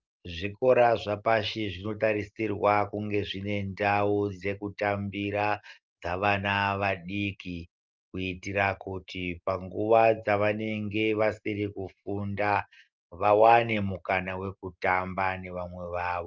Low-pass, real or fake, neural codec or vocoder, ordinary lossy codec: 7.2 kHz; real; none; Opus, 24 kbps